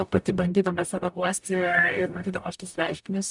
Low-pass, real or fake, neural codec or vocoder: 10.8 kHz; fake; codec, 44.1 kHz, 0.9 kbps, DAC